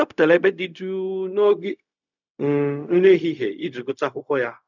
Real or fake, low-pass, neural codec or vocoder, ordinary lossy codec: fake; 7.2 kHz; codec, 16 kHz, 0.4 kbps, LongCat-Audio-Codec; none